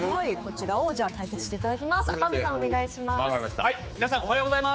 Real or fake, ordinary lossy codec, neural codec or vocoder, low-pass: fake; none; codec, 16 kHz, 4 kbps, X-Codec, HuBERT features, trained on general audio; none